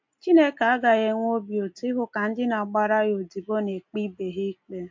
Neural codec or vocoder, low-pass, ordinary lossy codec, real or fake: none; 7.2 kHz; MP3, 48 kbps; real